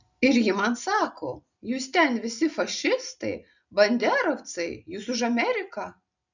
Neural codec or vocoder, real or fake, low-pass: none; real; 7.2 kHz